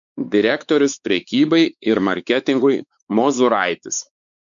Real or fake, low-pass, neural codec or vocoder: fake; 7.2 kHz; codec, 16 kHz, 4 kbps, X-Codec, WavLM features, trained on Multilingual LibriSpeech